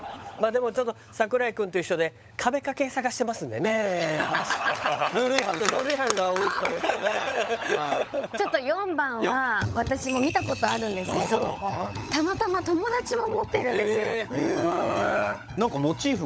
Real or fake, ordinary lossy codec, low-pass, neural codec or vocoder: fake; none; none; codec, 16 kHz, 16 kbps, FunCodec, trained on LibriTTS, 50 frames a second